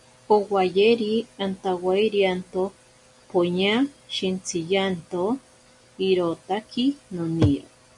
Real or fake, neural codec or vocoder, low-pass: real; none; 10.8 kHz